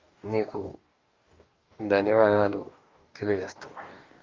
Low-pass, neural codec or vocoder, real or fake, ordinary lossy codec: 7.2 kHz; codec, 44.1 kHz, 2.6 kbps, DAC; fake; Opus, 32 kbps